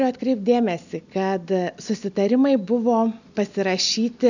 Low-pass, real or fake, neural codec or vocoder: 7.2 kHz; real; none